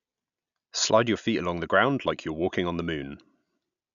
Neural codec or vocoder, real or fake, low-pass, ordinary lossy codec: none; real; 7.2 kHz; none